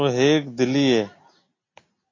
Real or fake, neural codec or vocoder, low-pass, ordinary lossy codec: real; none; 7.2 kHz; AAC, 32 kbps